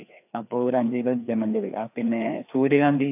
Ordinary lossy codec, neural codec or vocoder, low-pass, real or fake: AAC, 32 kbps; codec, 16 kHz, 2 kbps, FreqCodec, larger model; 3.6 kHz; fake